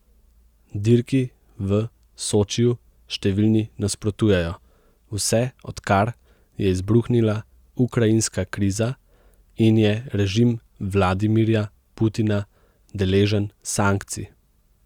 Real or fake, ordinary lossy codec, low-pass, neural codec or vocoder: real; Opus, 64 kbps; 19.8 kHz; none